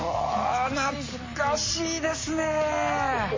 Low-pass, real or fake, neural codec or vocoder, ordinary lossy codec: 7.2 kHz; real; none; MP3, 32 kbps